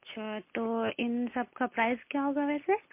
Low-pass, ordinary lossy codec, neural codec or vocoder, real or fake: 3.6 kHz; MP3, 24 kbps; none; real